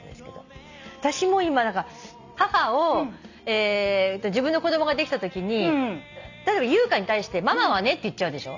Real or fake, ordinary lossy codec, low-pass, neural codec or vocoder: real; none; 7.2 kHz; none